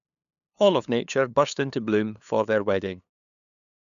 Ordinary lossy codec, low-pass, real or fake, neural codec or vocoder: none; 7.2 kHz; fake; codec, 16 kHz, 2 kbps, FunCodec, trained on LibriTTS, 25 frames a second